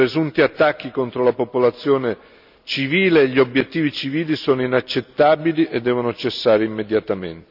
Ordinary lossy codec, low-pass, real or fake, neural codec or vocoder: none; 5.4 kHz; real; none